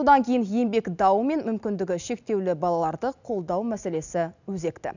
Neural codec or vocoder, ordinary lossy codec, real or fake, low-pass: none; none; real; 7.2 kHz